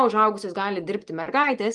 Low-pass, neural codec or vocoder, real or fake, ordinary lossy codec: 10.8 kHz; none; real; Opus, 32 kbps